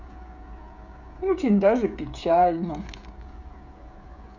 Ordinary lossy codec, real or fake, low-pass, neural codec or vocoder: none; fake; 7.2 kHz; codec, 16 kHz, 16 kbps, FreqCodec, smaller model